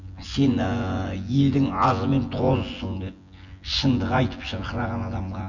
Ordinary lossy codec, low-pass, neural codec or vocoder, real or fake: none; 7.2 kHz; vocoder, 24 kHz, 100 mel bands, Vocos; fake